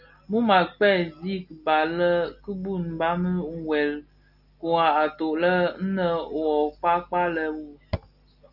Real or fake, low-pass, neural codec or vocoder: real; 5.4 kHz; none